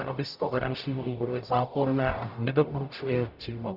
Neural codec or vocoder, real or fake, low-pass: codec, 44.1 kHz, 0.9 kbps, DAC; fake; 5.4 kHz